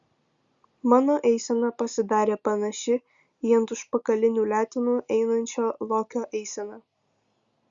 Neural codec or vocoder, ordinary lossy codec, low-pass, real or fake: none; Opus, 64 kbps; 7.2 kHz; real